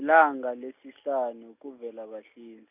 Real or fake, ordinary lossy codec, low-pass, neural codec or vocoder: real; none; 3.6 kHz; none